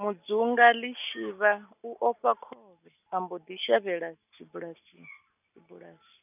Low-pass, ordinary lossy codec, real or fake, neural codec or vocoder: 3.6 kHz; none; real; none